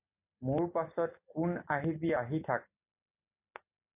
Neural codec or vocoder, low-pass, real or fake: none; 3.6 kHz; real